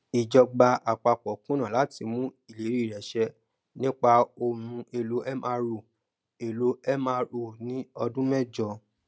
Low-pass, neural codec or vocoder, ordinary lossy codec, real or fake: none; none; none; real